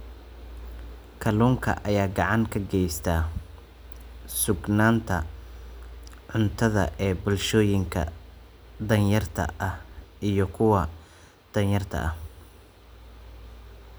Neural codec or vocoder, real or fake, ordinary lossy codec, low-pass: none; real; none; none